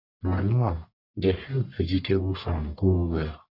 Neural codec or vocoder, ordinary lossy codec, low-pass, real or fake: codec, 44.1 kHz, 1.7 kbps, Pupu-Codec; none; 5.4 kHz; fake